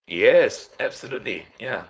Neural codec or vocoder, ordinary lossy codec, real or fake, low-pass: codec, 16 kHz, 4.8 kbps, FACodec; none; fake; none